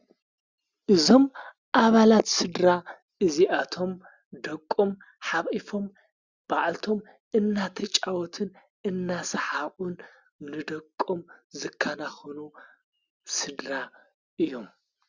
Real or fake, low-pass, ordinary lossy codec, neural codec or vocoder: real; 7.2 kHz; Opus, 64 kbps; none